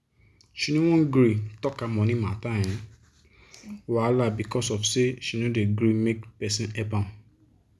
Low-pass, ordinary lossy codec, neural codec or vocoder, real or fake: none; none; none; real